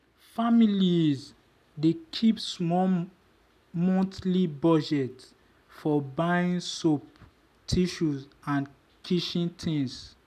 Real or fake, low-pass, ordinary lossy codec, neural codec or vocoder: real; 14.4 kHz; none; none